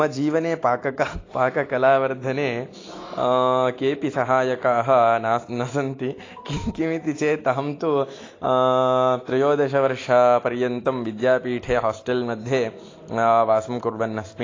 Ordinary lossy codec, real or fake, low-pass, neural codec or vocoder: AAC, 32 kbps; real; 7.2 kHz; none